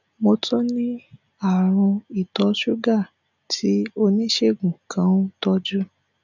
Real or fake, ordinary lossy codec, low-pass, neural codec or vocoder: real; none; 7.2 kHz; none